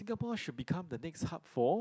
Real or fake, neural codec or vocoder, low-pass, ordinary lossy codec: real; none; none; none